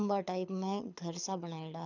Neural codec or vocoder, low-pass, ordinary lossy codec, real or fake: codec, 24 kHz, 6 kbps, HILCodec; 7.2 kHz; none; fake